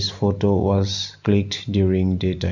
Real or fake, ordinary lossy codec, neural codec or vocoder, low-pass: real; none; none; 7.2 kHz